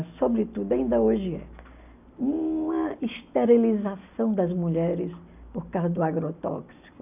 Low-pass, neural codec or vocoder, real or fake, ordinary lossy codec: 3.6 kHz; none; real; none